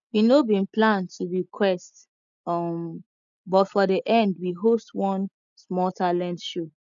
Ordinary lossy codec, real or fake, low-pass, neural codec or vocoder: none; real; 7.2 kHz; none